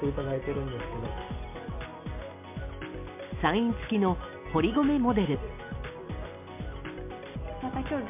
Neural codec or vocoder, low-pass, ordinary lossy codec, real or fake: none; 3.6 kHz; MP3, 32 kbps; real